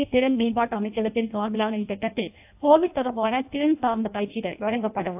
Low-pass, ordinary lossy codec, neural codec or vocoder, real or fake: 3.6 kHz; AAC, 32 kbps; codec, 16 kHz in and 24 kHz out, 0.6 kbps, FireRedTTS-2 codec; fake